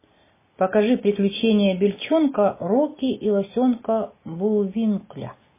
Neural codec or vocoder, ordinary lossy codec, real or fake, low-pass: none; MP3, 16 kbps; real; 3.6 kHz